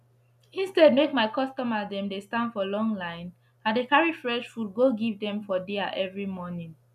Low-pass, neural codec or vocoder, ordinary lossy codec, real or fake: 14.4 kHz; none; none; real